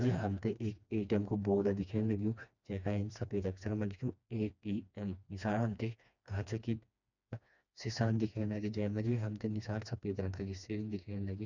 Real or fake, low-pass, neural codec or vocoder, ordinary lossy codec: fake; 7.2 kHz; codec, 16 kHz, 2 kbps, FreqCodec, smaller model; none